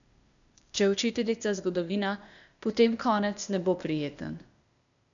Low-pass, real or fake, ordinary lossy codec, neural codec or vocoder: 7.2 kHz; fake; none; codec, 16 kHz, 0.8 kbps, ZipCodec